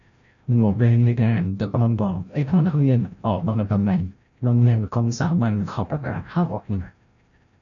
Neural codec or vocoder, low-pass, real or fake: codec, 16 kHz, 0.5 kbps, FreqCodec, larger model; 7.2 kHz; fake